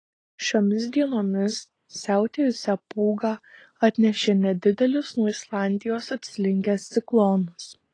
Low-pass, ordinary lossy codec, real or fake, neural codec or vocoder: 9.9 kHz; AAC, 32 kbps; real; none